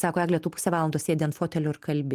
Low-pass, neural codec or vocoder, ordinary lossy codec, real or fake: 14.4 kHz; none; Opus, 24 kbps; real